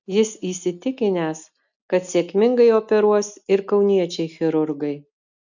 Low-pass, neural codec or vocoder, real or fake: 7.2 kHz; none; real